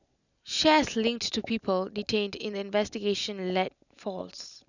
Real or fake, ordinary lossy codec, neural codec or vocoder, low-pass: real; none; none; 7.2 kHz